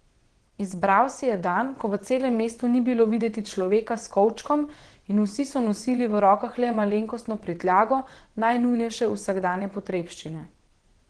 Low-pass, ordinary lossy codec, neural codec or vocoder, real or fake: 9.9 kHz; Opus, 16 kbps; vocoder, 22.05 kHz, 80 mel bands, WaveNeXt; fake